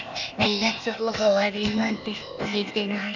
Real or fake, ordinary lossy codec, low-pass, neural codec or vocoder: fake; none; 7.2 kHz; codec, 16 kHz, 0.8 kbps, ZipCodec